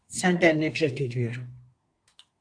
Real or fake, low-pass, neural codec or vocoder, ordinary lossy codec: fake; 9.9 kHz; codec, 24 kHz, 1 kbps, SNAC; AAC, 64 kbps